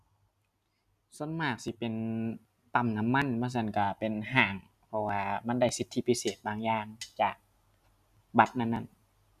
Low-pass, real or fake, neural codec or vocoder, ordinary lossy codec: 14.4 kHz; real; none; none